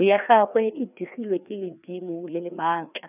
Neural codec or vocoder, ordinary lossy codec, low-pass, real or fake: codec, 16 kHz, 2 kbps, FreqCodec, larger model; none; 3.6 kHz; fake